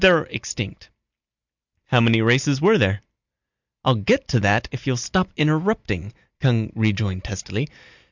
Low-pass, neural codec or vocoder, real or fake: 7.2 kHz; none; real